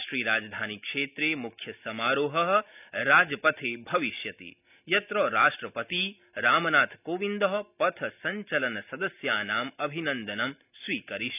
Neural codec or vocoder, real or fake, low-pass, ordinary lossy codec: none; real; 3.6 kHz; none